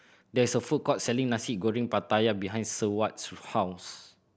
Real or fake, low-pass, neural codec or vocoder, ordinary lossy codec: real; none; none; none